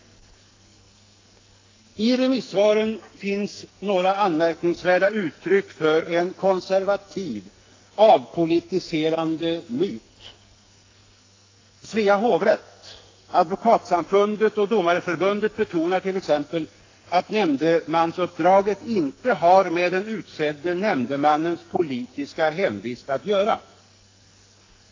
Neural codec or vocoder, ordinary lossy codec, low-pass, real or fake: codec, 44.1 kHz, 2.6 kbps, SNAC; AAC, 32 kbps; 7.2 kHz; fake